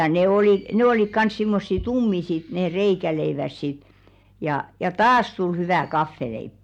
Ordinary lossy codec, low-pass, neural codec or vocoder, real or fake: none; 19.8 kHz; none; real